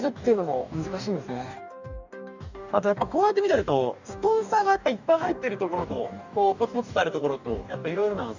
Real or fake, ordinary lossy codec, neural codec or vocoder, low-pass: fake; none; codec, 44.1 kHz, 2.6 kbps, DAC; 7.2 kHz